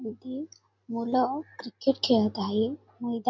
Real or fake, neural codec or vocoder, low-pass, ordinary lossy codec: real; none; 7.2 kHz; MP3, 48 kbps